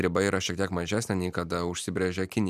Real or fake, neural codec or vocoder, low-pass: real; none; 14.4 kHz